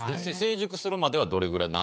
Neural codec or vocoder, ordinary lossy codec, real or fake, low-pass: codec, 16 kHz, 4 kbps, X-Codec, HuBERT features, trained on general audio; none; fake; none